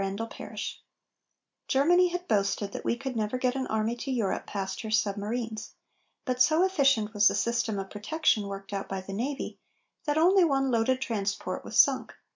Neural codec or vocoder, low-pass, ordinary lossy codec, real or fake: none; 7.2 kHz; MP3, 64 kbps; real